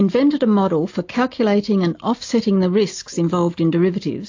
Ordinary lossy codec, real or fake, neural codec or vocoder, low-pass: AAC, 48 kbps; real; none; 7.2 kHz